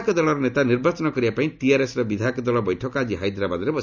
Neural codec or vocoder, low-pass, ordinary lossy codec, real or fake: none; 7.2 kHz; none; real